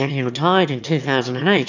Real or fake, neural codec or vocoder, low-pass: fake; autoencoder, 22.05 kHz, a latent of 192 numbers a frame, VITS, trained on one speaker; 7.2 kHz